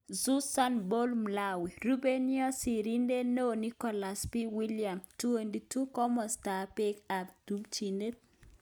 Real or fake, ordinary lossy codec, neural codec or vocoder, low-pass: real; none; none; none